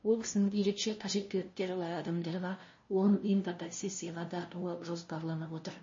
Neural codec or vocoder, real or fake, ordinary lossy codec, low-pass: codec, 16 kHz, 0.5 kbps, FunCodec, trained on LibriTTS, 25 frames a second; fake; MP3, 32 kbps; 7.2 kHz